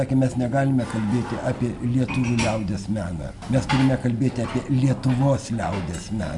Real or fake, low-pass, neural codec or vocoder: real; 10.8 kHz; none